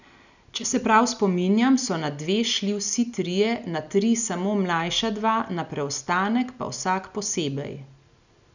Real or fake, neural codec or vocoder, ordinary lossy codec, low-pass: real; none; none; 7.2 kHz